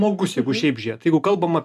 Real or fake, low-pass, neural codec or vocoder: real; 14.4 kHz; none